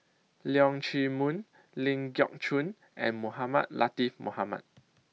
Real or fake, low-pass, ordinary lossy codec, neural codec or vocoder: real; none; none; none